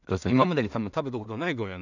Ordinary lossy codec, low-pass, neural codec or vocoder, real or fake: none; 7.2 kHz; codec, 16 kHz in and 24 kHz out, 0.4 kbps, LongCat-Audio-Codec, two codebook decoder; fake